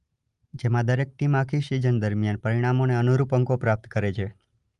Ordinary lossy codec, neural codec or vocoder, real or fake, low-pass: Opus, 32 kbps; none; real; 10.8 kHz